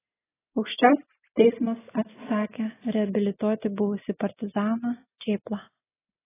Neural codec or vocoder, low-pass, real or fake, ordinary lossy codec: none; 3.6 kHz; real; AAC, 16 kbps